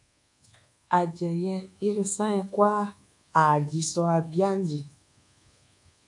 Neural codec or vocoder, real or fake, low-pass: codec, 24 kHz, 1.2 kbps, DualCodec; fake; 10.8 kHz